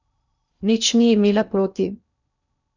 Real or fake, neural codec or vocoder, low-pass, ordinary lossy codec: fake; codec, 16 kHz in and 24 kHz out, 0.8 kbps, FocalCodec, streaming, 65536 codes; 7.2 kHz; none